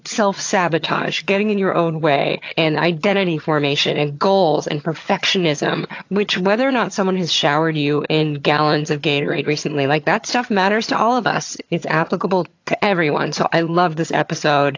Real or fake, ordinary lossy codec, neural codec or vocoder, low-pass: fake; AAC, 48 kbps; vocoder, 22.05 kHz, 80 mel bands, HiFi-GAN; 7.2 kHz